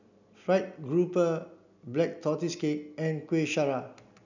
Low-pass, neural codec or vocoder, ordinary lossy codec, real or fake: 7.2 kHz; none; none; real